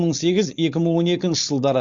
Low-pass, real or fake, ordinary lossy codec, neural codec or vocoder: 7.2 kHz; fake; Opus, 64 kbps; codec, 16 kHz, 4.8 kbps, FACodec